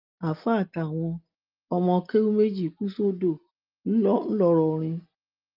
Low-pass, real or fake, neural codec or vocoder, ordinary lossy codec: 5.4 kHz; real; none; Opus, 24 kbps